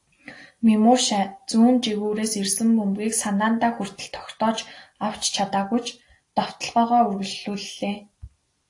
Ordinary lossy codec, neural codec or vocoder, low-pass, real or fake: AAC, 48 kbps; none; 10.8 kHz; real